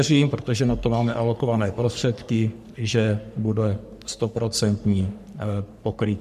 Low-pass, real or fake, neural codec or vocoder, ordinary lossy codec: 14.4 kHz; fake; codec, 44.1 kHz, 3.4 kbps, Pupu-Codec; MP3, 96 kbps